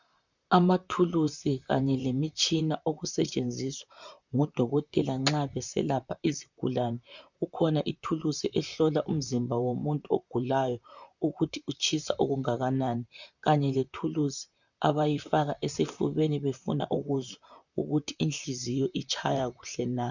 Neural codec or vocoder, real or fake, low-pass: vocoder, 44.1 kHz, 128 mel bands, Pupu-Vocoder; fake; 7.2 kHz